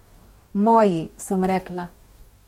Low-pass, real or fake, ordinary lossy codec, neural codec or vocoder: 19.8 kHz; fake; MP3, 64 kbps; codec, 44.1 kHz, 2.6 kbps, DAC